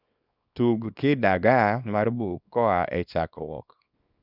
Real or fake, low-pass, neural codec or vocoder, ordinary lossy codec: fake; 5.4 kHz; codec, 24 kHz, 0.9 kbps, WavTokenizer, small release; none